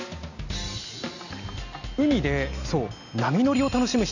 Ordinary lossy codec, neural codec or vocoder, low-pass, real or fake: none; none; 7.2 kHz; real